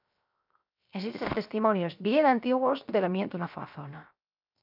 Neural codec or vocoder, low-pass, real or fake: codec, 16 kHz, 0.7 kbps, FocalCodec; 5.4 kHz; fake